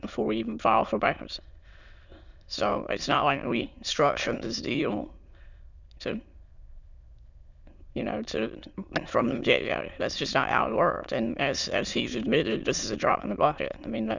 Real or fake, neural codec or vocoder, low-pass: fake; autoencoder, 22.05 kHz, a latent of 192 numbers a frame, VITS, trained on many speakers; 7.2 kHz